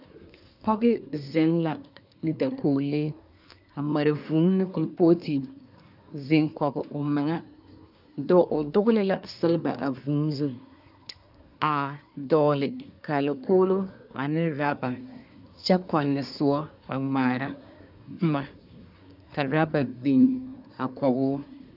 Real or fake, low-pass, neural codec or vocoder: fake; 5.4 kHz; codec, 24 kHz, 1 kbps, SNAC